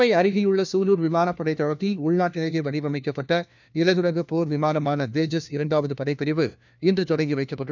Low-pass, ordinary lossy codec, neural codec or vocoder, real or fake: 7.2 kHz; none; codec, 16 kHz, 1 kbps, FunCodec, trained on LibriTTS, 50 frames a second; fake